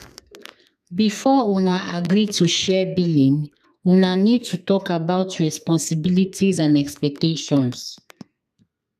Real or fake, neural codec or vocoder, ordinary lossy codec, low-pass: fake; codec, 32 kHz, 1.9 kbps, SNAC; none; 14.4 kHz